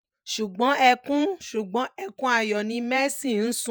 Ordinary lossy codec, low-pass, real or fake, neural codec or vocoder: none; none; fake; vocoder, 48 kHz, 128 mel bands, Vocos